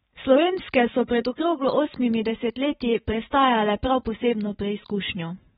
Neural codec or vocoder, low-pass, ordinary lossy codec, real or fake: vocoder, 44.1 kHz, 128 mel bands every 512 samples, BigVGAN v2; 19.8 kHz; AAC, 16 kbps; fake